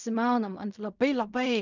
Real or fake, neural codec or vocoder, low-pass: fake; codec, 16 kHz in and 24 kHz out, 0.4 kbps, LongCat-Audio-Codec, fine tuned four codebook decoder; 7.2 kHz